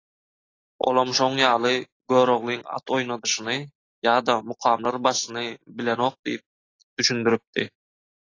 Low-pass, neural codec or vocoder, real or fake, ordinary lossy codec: 7.2 kHz; none; real; AAC, 32 kbps